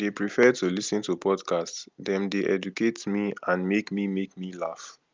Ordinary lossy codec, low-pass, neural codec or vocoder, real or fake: Opus, 32 kbps; 7.2 kHz; none; real